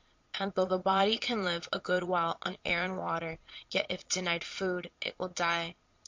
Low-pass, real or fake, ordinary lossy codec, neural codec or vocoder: 7.2 kHz; fake; MP3, 48 kbps; vocoder, 22.05 kHz, 80 mel bands, Vocos